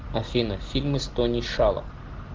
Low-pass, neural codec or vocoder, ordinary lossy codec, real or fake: 7.2 kHz; none; Opus, 16 kbps; real